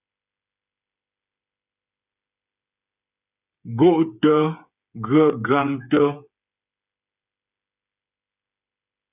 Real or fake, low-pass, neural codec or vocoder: fake; 3.6 kHz; codec, 16 kHz, 8 kbps, FreqCodec, smaller model